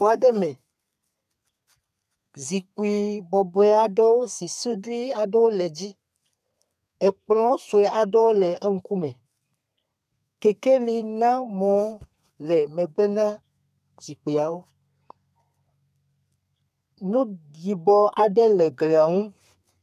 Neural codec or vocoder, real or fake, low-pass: codec, 32 kHz, 1.9 kbps, SNAC; fake; 14.4 kHz